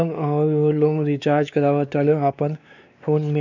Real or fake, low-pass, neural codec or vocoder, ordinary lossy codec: fake; 7.2 kHz; codec, 16 kHz, 2 kbps, X-Codec, WavLM features, trained on Multilingual LibriSpeech; none